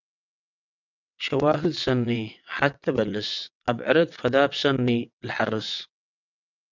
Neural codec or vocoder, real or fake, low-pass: vocoder, 22.05 kHz, 80 mel bands, WaveNeXt; fake; 7.2 kHz